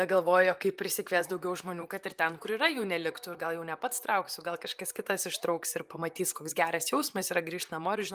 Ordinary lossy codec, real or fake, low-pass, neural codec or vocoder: Opus, 32 kbps; fake; 14.4 kHz; vocoder, 44.1 kHz, 128 mel bands, Pupu-Vocoder